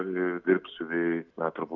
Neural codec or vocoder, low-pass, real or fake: none; 7.2 kHz; real